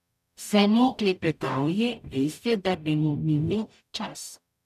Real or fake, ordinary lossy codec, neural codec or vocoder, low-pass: fake; none; codec, 44.1 kHz, 0.9 kbps, DAC; 14.4 kHz